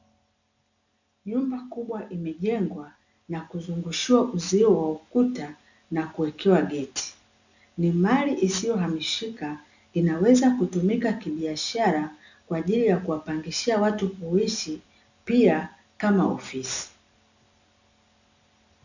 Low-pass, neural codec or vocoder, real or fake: 7.2 kHz; none; real